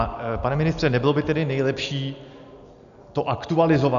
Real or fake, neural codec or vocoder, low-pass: real; none; 7.2 kHz